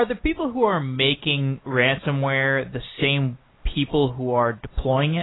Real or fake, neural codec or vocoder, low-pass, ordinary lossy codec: real; none; 7.2 kHz; AAC, 16 kbps